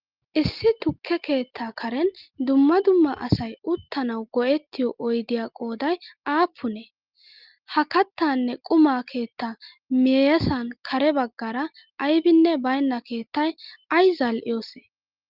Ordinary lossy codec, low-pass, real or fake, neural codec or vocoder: Opus, 32 kbps; 5.4 kHz; real; none